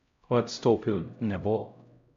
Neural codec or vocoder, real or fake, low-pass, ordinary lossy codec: codec, 16 kHz, 0.5 kbps, X-Codec, HuBERT features, trained on LibriSpeech; fake; 7.2 kHz; MP3, 64 kbps